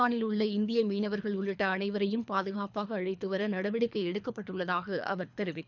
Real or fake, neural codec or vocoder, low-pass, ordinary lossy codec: fake; codec, 24 kHz, 3 kbps, HILCodec; 7.2 kHz; none